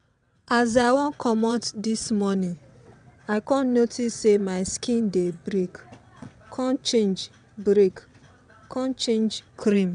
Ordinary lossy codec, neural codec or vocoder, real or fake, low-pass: none; vocoder, 22.05 kHz, 80 mel bands, WaveNeXt; fake; 9.9 kHz